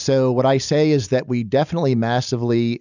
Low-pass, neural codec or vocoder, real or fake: 7.2 kHz; none; real